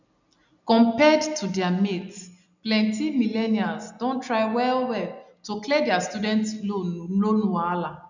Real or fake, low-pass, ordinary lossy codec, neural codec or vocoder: real; 7.2 kHz; none; none